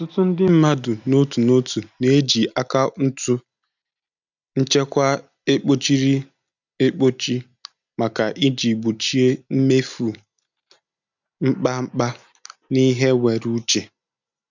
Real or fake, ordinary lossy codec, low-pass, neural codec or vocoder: real; none; 7.2 kHz; none